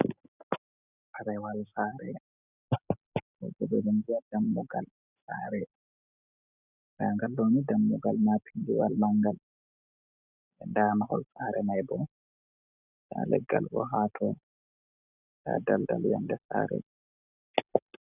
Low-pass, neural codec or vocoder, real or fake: 3.6 kHz; none; real